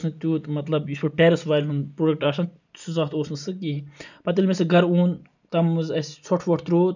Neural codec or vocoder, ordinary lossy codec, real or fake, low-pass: none; none; real; 7.2 kHz